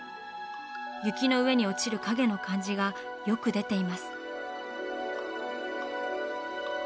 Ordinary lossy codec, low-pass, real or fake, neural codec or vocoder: none; none; real; none